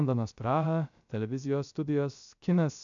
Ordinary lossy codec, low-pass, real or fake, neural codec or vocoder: MP3, 96 kbps; 7.2 kHz; fake; codec, 16 kHz, 0.3 kbps, FocalCodec